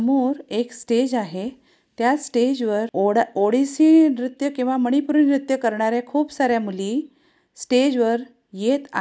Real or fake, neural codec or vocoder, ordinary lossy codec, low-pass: real; none; none; none